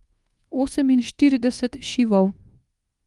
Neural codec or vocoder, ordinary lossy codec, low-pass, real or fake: codec, 24 kHz, 1.2 kbps, DualCodec; Opus, 24 kbps; 10.8 kHz; fake